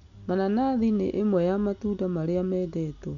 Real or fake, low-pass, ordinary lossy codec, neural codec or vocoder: real; 7.2 kHz; none; none